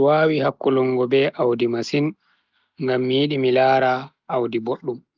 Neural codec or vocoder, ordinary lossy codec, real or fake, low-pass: none; Opus, 24 kbps; real; 7.2 kHz